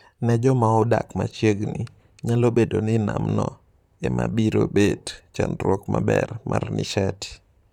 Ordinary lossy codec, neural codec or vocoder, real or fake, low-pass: none; vocoder, 44.1 kHz, 128 mel bands, Pupu-Vocoder; fake; 19.8 kHz